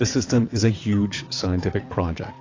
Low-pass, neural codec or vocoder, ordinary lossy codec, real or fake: 7.2 kHz; vocoder, 22.05 kHz, 80 mel bands, WaveNeXt; AAC, 32 kbps; fake